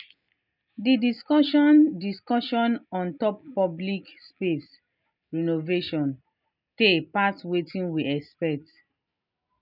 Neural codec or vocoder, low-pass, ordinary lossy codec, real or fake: none; 5.4 kHz; none; real